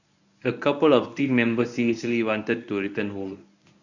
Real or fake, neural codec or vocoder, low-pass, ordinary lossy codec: fake; codec, 24 kHz, 0.9 kbps, WavTokenizer, medium speech release version 1; 7.2 kHz; none